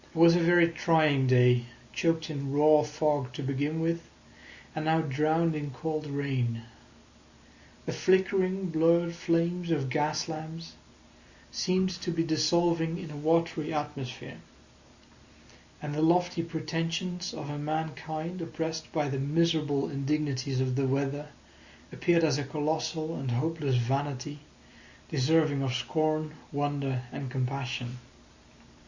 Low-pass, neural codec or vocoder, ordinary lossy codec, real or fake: 7.2 kHz; none; Opus, 64 kbps; real